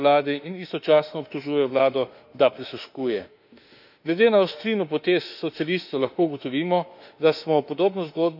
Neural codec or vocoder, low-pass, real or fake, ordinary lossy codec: autoencoder, 48 kHz, 32 numbers a frame, DAC-VAE, trained on Japanese speech; 5.4 kHz; fake; none